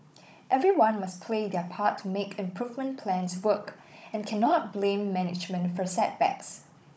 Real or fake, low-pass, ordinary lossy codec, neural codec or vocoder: fake; none; none; codec, 16 kHz, 16 kbps, FunCodec, trained on Chinese and English, 50 frames a second